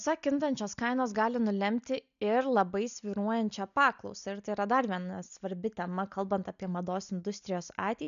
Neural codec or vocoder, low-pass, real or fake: none; 7.2 kHz; real